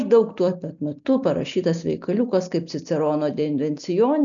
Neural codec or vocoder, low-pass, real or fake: none; 7.2 kHz; real